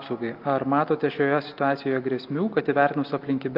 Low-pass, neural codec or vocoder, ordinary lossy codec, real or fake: 5.4 kHz; none; Opus, 24 kbps; real